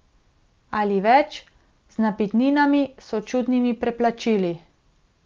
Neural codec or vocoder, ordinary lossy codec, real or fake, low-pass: none; Opus, 32 kbps; real; 7.2 kHz